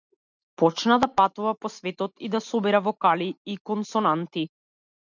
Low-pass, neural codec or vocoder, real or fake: 7.2 kHz; none; real